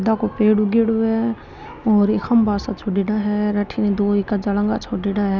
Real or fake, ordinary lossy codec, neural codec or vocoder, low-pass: real; none; none; 7.2 kHz